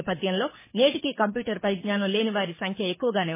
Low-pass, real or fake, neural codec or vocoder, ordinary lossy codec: 3.6 kHz; fake; codec, 24 kHz, 6 kbps, HILCodec; MP3, 16 kbps